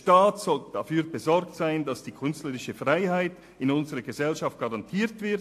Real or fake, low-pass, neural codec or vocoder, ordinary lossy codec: real; 14.4 kHz; none; AAC, 64 kbps